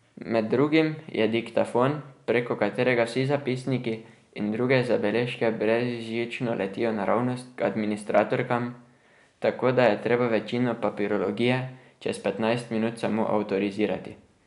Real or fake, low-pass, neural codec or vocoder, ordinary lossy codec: real; 10.8 kHz; none; none